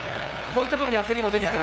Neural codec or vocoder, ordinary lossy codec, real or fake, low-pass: codec, 16 kHz, 2 kbps, FunCodec, trained on LibriTTS, 25 frames a second; none; fake; none